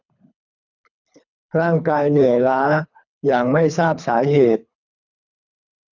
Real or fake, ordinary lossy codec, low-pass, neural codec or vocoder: fake; none; 7.2 kHz; codec, 24 kHz, 3 kbps, HILCodec